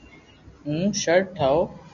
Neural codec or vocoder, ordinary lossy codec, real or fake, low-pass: none; AAC, 64 kbps; real; 7.2 kHz